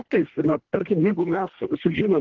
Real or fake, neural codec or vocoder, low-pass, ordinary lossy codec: fake; codec, 24 kHz, 1.5 kbps, HILCodec; 7.2 kHz; Opus, 16 kbps